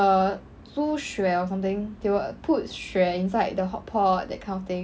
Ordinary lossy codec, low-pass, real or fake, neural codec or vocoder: none; none; real; none